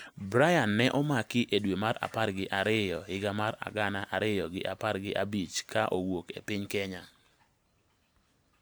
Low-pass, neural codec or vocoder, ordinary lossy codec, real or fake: none; none; none; real